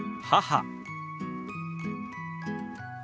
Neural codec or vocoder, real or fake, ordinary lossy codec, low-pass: none; real; none; none